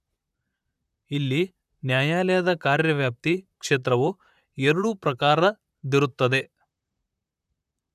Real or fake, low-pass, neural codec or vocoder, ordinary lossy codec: fake; 14.4 kHz; vocoder, 44.1 kHz, 128 mel bands, Pupu-Vocoder; none